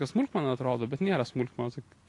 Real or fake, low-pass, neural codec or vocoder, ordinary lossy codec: real; 10.8 kHz; none; AAC, 48 kbps